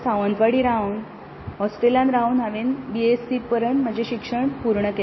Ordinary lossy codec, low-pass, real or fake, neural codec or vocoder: MP3, 24 kbps; 7.2 kHz; real; none